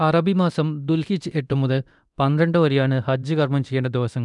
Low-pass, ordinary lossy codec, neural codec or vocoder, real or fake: 10.8 kHz; none; codec, 24 kHz, 0.9 kbps, DualCodec; fake